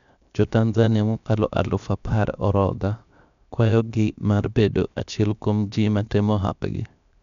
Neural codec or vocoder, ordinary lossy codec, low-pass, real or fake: codec, 16 kHz, 0.7 kbps, FocalCodec; none; 7.2 kHz; fake